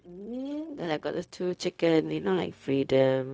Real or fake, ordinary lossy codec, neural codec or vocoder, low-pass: fake; none; codec, 16 kHz, 0.4 kbps, LongCat-Audio-Codec; none